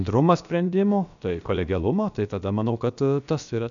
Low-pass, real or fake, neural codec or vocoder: 7.2 kHz; fake; codec, 16 kHz, about 1 kbps, DyCAST, with the encoder's durations